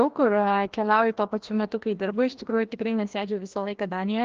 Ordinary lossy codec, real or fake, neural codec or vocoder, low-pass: Opus, 16 kbps; fake; codec, 16 kHz, 1 kbps, FreqCodec, larger model; 7.2 kHz